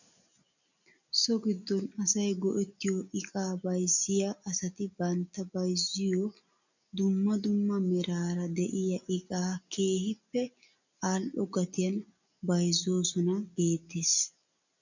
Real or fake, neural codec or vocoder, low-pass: real; none; 7.2 kHz